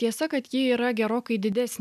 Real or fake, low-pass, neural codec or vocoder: real; 14.4 kHz; none